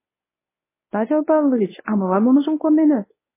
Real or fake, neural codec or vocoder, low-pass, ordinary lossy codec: fake; codec, 24 kHz, 0.9 kbps, WavTokenizer, medium speech release version 1; 3.6 kHz; MP3, 16 kbps